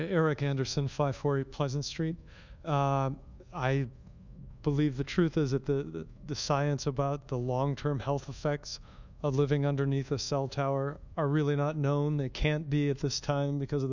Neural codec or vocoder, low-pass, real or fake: codec, 24 kHz, 1.2 kbps, DualCodec; 7.2 kHz; fake